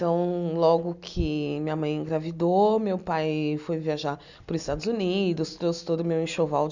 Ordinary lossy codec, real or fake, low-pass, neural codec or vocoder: none; real; 7.2 kHz; none